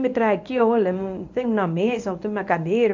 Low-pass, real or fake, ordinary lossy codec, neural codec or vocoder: 7.2 kHz; fake; AAC, 48 kbps; codec, 24 kHz, 0.9 kbps, WavTokenizer, medium speech release version 1